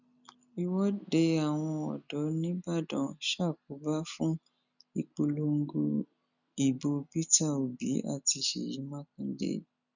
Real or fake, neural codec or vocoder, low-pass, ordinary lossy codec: real; none; 7.2 kHz; none